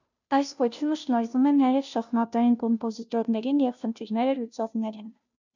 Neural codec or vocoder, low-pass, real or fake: codec, 16 kHz, 0.5 kbps, FunCodec, trained on Chinese and English, 25 frames a second; 7.2 kHz; fake